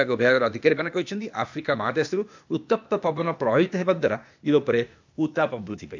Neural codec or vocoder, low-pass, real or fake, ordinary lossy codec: codec, 16 kHz, 0.8 kbps, ZipCodec; 7.2 kHz; fake; MP3, 64 kbps